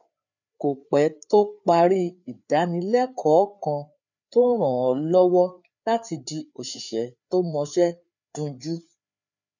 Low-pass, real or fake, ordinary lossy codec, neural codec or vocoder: 7.2 kHz; fake; none; codec, 16 kHz, 4 kbps, FreqCodec, larger model